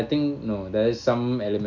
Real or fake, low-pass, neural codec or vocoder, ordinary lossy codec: real; 7.2 kHz; none; none